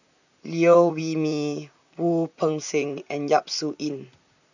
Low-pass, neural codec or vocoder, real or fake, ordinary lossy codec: 7.2 kHz; vocoder, 44.1 kHz, 128 mel bands, Pupu-Vocoder; fake; none